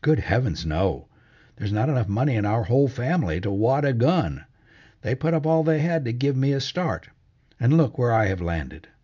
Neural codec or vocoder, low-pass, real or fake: none; 7.2 kHz; real